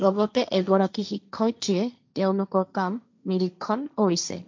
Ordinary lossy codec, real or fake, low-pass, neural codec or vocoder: MP3, 64 kbps; fake; 7.2 kHz; codec, 16 kHz, 1.1 kbps, Voila-Tokenizer